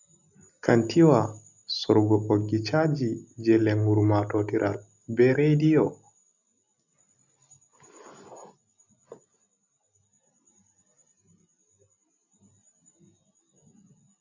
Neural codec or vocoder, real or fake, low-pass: none; real; 7.2 kHz